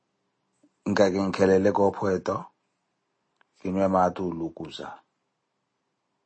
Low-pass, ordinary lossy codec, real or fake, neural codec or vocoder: 9.9 kHz; MP3, 32 kbps; real; none